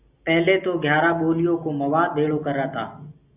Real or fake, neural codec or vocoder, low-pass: real; none; 3.6 kHz